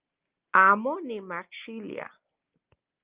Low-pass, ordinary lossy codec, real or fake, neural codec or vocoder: 3.6 kHz; Opus, 32 kbps; real; none